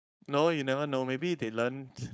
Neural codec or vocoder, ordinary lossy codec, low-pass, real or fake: codec, 16 kHz, 4.8 kbps, FACodec; none; none; fake